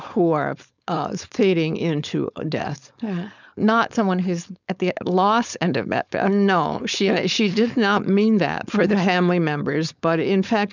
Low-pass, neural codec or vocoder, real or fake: 7.2 kHz; codec, 16 kHz, 4.8 kbps, FACodec; fake